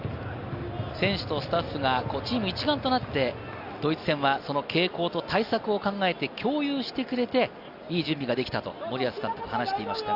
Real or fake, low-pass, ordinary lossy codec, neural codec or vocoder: real; 5.4 kHz; Opus, 64 kbps; none